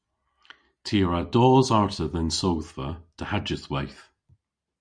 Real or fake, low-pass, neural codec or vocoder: real; 9.9 kHz; none